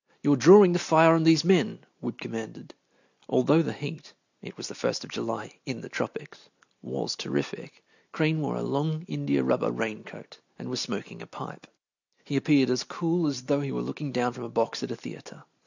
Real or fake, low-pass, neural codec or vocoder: real; 7.2 kHz; none